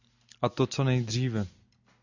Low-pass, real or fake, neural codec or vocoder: 7.2 kHz; real; none